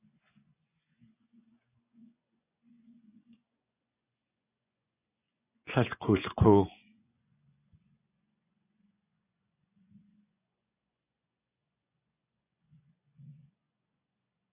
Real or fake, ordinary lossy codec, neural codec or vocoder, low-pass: fake; AAC, 24 kbps; vocoder, 24 kHz, 100 mel bands, Vocos; 3.6 kHz